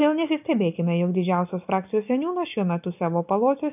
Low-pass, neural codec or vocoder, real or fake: 3.6 kHz; none; real